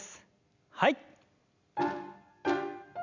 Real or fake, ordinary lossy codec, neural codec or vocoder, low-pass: real; none; none; 7.2 kHz